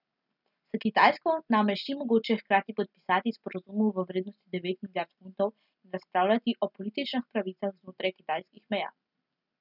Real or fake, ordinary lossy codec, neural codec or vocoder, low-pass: real; none; none; 5.4 kHz